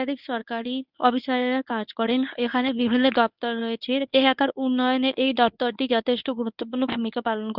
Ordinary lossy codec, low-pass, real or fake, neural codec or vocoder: none; 5.4 kHz; fake; codec, 24 kHz, 0.9 kbps, WavTokenizer, medium speech release version 1